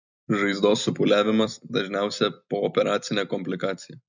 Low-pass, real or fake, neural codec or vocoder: 7.2 kHz; fake; vocoder, 44.1 kHz, 128 mel bands every 256 samples, BigVGAN v2